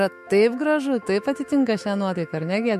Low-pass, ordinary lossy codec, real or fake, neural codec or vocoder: 14.4 kHz; MP3, 64 kbps; fake; autoencoder, 48 kHz, 128 numbers a frame, DAC-VAE, trained on Japanese speech